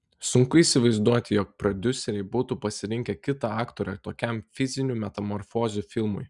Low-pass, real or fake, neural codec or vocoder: 10.8 kHz; real; none